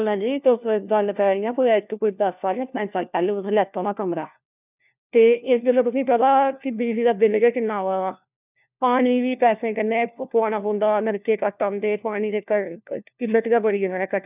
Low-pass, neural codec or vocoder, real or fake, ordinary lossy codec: 3.6 kHz; codec, 16 kHz, 1 kbps, FunCodec, trained on LibriTTS, 50 frames a second; fake; none